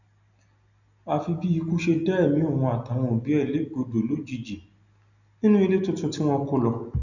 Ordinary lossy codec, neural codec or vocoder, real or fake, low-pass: none; none; real; 7.2 kHz